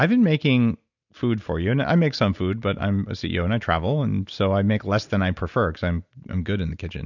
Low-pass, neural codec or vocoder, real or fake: 7.2 kHz; none; real